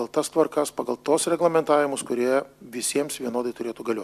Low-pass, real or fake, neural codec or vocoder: 14.4 kHz; real; none